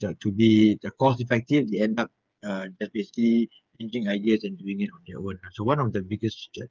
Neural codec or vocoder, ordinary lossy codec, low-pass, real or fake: codec, 16 kHz, 8 kbps, FreqCodec, smaller model; Opus, 24 kbps; 7.2 kHz; fake